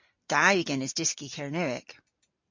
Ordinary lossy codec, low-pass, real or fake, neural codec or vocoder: MP3, 48 kbps; 7.2 kHz; real; none